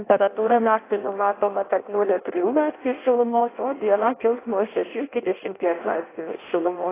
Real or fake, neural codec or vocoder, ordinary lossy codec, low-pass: fake; codec, 16 kHz in and 24 kHz out, 0.6 kbps, FireRedTTS-2 codec; AAC, 16 kbps; 3.6 kHz